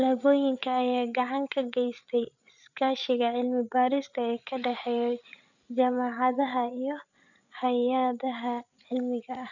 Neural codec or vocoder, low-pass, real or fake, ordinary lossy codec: codec, 16 kHz, 8 kbps, FreqCodec, larger model; 7.2 kHz; fake; none